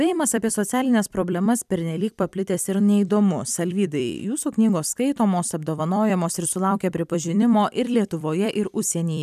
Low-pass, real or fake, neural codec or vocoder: 14.4 kHz; fake; vocoder, 44.1 kHz, 128 mel bands every 256 samples, BigVGAN v2